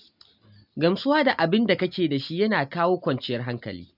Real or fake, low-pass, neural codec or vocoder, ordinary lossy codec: real; 5.4 kHz; none; none